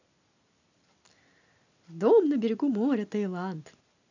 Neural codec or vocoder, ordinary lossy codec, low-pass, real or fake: none; none; 7.2 kHz; real